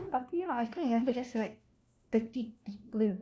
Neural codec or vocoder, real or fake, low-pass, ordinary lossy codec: codec, 16 kHz, 1 kbps, FunCodec, trained on LibriTTS, 50 frames a second; fake; none; none